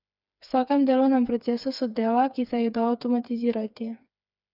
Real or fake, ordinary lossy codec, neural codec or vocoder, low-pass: fake; none; codec, 16 kHz, 4 kbps, FreqCodec, smaller model; 5.4 kHz